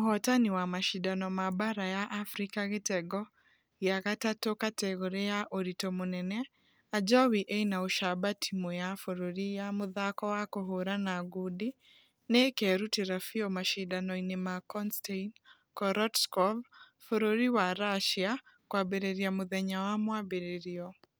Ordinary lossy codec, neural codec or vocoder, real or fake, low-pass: none; none; real; none